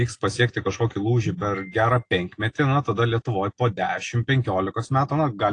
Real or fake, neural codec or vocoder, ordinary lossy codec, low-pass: real; none; AAC, 48 kbps; 9.9 kHz